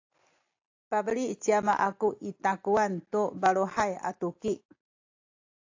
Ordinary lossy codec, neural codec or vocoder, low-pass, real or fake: AAC, 48 kbps; none; 7.2 kHz; real